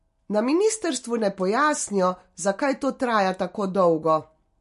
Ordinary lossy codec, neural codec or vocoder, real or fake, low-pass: MP3, 48 kbps; none; real; 14.4 kHz